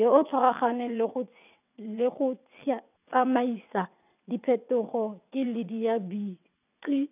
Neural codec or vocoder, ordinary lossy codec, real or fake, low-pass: vocoder, 22.05 kHz, 80 mel bands, WaveNeXt; none; fake; 3.6 kHz